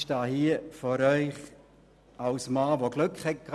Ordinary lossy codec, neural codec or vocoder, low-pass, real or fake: none; none; none; real